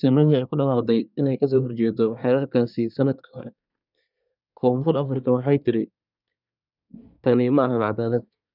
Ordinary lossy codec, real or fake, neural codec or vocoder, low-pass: none; fake; codec, 24 kHz, 1 kbps, SNAC; 5.4 kHz